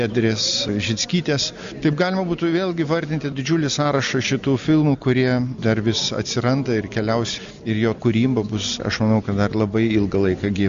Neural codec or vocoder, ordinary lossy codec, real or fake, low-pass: none; MP3, 64 kbps; real; 7.2 kHz